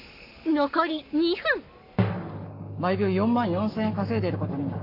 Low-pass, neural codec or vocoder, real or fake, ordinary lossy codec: 5.4 kHz; codec, 44.1 kHz, 7.8 kbps, Pupu-Codec; fake; MP3, 48 kbps